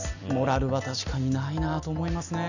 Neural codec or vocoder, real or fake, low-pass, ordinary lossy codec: none; real; 7.2 kHz; none